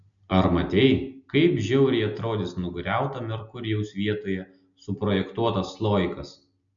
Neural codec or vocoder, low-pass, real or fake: none; 7.2 kHz; real